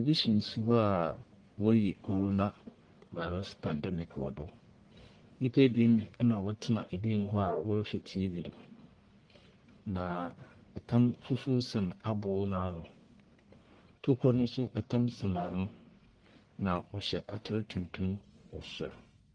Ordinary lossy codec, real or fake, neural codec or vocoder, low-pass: Opus, 24 kbps; fake; codec, 44.1 kHz, 1.7 kbps, Pupu-Codec; 9.9 kHz